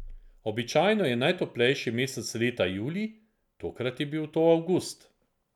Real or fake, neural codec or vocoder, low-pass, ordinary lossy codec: real; none; 19.8 kHz; none